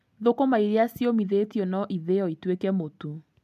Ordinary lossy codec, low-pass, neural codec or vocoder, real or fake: none; 14.4 kHz; none; real